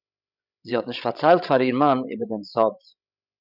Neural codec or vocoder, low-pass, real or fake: codec, 16 kHz, 16 kbps, FreqCodec, larger model; 5.4 kHz; fake